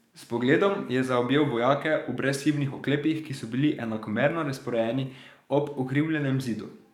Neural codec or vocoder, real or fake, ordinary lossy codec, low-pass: codec, 44.1 kHz, 7.8 kbps, DAC; fake; none; 19.8 kHz